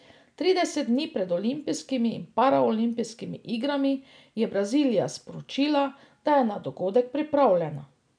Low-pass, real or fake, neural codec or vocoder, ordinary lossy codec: 9.9 kHz; real; none; none